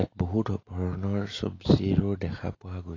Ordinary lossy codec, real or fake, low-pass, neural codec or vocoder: AAC, 32 kbps; real; 7.2 kHz; none